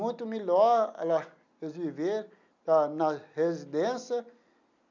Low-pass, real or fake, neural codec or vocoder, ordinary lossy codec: 7.2 kHz; real; none; none